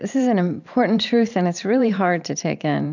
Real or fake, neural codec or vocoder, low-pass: fake; vocoder, 44.1 kHz, 128 mel bands every 512 samples, BigVGAN v2; 7.2 kHz